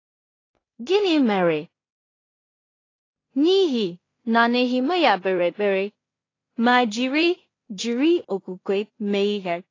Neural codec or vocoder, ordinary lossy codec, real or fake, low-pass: codec, 16 kHz in and 24 kHz out, 0.4 kbps, LongCat-Audio-Codec, two codebook decoder; AAC, 32 kbps; fake; 7.2 kHz